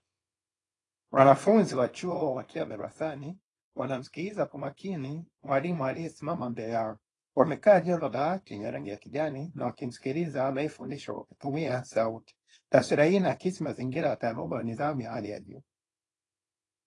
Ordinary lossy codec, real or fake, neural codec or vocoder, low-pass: AAC, 32 kbps; fake; codec, 24 kHz, 0.9 kbps, WavTokenizer, small release; 10.8 kHz